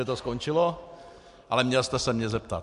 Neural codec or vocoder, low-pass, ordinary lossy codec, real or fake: none; 10.8 kHz; MP3, 64 kbps; real